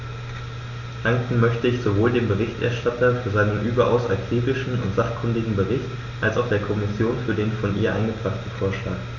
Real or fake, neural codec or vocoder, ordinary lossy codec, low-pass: real; none; none; 7.2 kHz